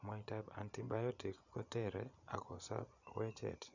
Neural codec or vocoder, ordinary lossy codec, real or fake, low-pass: vocoder, 24 kHz, 100 mel bands, Vocos; none; fake; 7.2 kHz